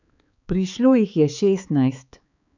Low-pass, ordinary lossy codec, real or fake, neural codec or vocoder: 7.2 kHz; none; fake; codec, 16 kHz, 4 kbps, X-Codec, HuBERT features, trained on balanced general audio